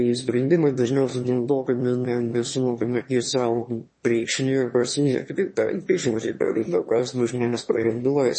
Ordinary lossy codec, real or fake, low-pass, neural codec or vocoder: MP3, 32 kbps; fake; 9.9 kHz; autoencoder, 22.05 kHz, a latent of 192 numbers a frame, VITS, trained on one speaker